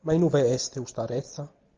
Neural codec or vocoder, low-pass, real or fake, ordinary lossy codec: none; 7.2 kHz; real; Opus, 24 kbps